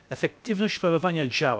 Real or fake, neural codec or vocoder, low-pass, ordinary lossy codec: fake; codec, 16 kHz, 0.7 kbps, FocalCodec; none; none